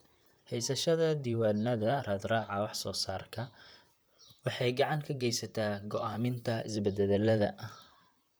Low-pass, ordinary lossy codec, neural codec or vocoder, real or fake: none; none; vocoder, 44.1 kHz, 128 mel bands, Pupu-Vocoder; fake